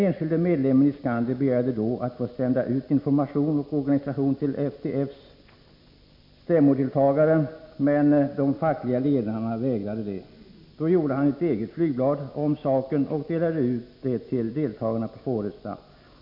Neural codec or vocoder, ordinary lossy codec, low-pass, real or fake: none; MP3, 48 kbps; 5.4 kHz; real